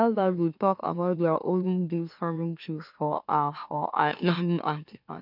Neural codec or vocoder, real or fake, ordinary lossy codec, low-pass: autoencoder, 44.1 kHz, a latent of 192 numbers a frame, MeloTTS; fake; none; 5.4 kHz